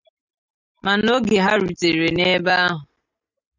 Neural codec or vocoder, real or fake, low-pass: none; real; 7.2 kHz